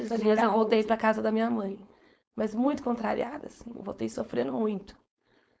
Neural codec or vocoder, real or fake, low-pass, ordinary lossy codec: codec, 16 kHz, 4.8 kbps, FACodec; fake; none; none